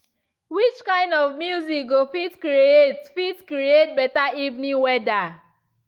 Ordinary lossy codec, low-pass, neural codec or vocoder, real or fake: Opus, 24 kbps; 19.8 kHz; autoencoder, 48 kHz, 128 numbers a frame, DAC-VAE, trained on Japanese speech; fake